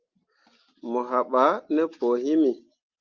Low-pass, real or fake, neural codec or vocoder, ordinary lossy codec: 7.2 kHz; real; none; Opus, 24 kbps